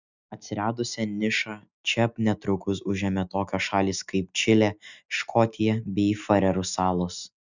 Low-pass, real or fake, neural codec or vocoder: 7.2 kHz; real; none